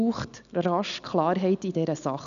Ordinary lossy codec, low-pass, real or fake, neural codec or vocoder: none; 7.2 kHz; real; none